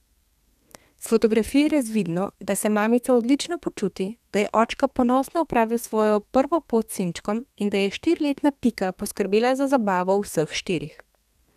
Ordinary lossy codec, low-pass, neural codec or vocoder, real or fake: none; 14.4 kHz; codec, 32 kHz, 1.9 kbps, SNAC; fake